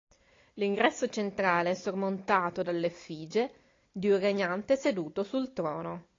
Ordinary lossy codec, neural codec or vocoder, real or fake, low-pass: AAC, 32 kbps; none; real; 7.2 kHz